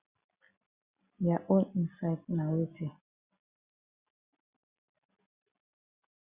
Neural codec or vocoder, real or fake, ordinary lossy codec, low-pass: none; real; Opus, 64 kbps; 3.6 kHz